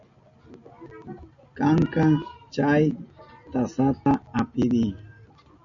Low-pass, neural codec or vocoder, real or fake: 7.2 kHz; none; real